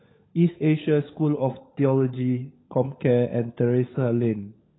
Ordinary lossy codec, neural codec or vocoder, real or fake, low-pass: AAC, 16 kbps; codec, 16 kHz, 16 kbps, FunCodec, trained on LibriTTS, 50 frames a second; fake; 7.2 kHz